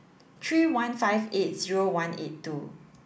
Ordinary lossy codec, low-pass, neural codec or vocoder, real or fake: none; none; none; real